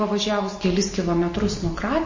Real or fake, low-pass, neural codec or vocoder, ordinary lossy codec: real; 7.2 kHz; none; MP3, 32 kbps